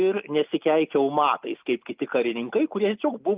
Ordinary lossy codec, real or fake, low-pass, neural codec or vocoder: Opus, 24 kbps; real; 3.6 kHz; none